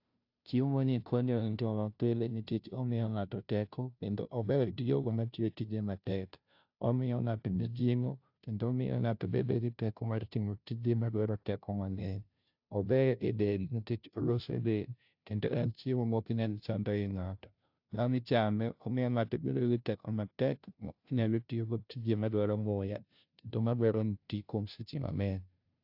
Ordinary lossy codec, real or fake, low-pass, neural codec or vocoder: AAC, 48 kbps; fake; 5.4 kHz; codec, 16 kHz, 0.5 kbps, FunCodec, trained on Chinese and English, 25 frames a second